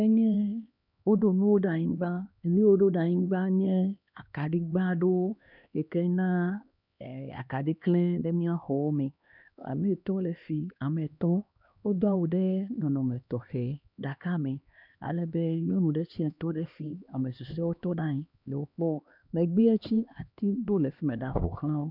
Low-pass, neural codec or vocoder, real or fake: 5.4 kHz; codec, 16 kHz, 2 kbps, X-Codec, HuBERT features, trained on LibriSpeech; fake